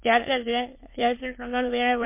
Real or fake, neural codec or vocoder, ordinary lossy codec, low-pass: fake; autoencoder, 22.05 kHz, a latent of 192 numbers a frame, VITS, trained on many speakers; MP3, 24 kbps; 3.6 kHz